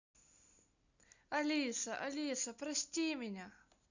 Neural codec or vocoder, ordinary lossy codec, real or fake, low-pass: none; none; real; 7.2 kHz